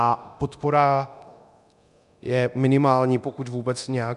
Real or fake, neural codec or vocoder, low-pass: fake; codec, 24 kHz, 0.9 kbps, DualCodec; 10.8 kHz